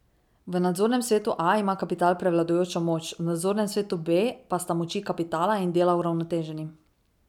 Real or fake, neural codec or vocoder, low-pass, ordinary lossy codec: real; none; 19.8 kHz; none